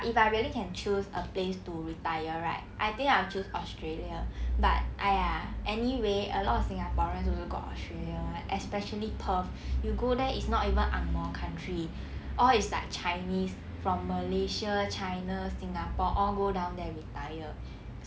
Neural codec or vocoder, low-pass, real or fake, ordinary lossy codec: none; none; real; none